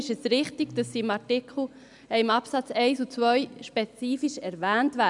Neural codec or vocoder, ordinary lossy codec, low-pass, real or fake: none; none; 10.8 kHz; real